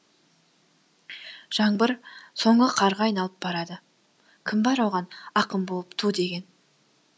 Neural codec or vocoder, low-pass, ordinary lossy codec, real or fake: none; none; none; real